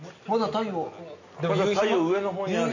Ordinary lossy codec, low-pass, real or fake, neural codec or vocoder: none; 7.2 kHz; real; none